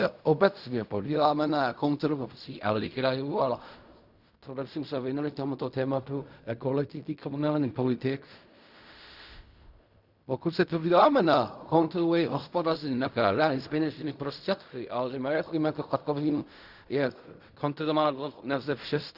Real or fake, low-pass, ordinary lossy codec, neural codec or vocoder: fake; 5.4 kHz; Opus, 64 kbps; codec, 16 kHz in and 24 kHz out, 0.4 kbps, LongCat-Audio-Codec, fine tuned four codebook decoder